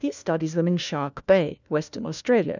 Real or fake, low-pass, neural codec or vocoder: fake; 7.2 kHz; codec, 16 kHz, 1 kbps, FunCodec, trained on LibriTTS, 50 frames a second